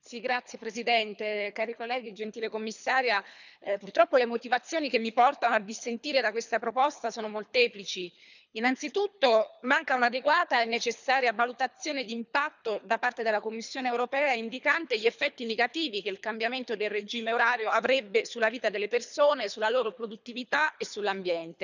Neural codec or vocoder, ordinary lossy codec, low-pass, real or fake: codec, 24 kHz, 3 kbps, HILCodec; none; 7.2 kHz; fake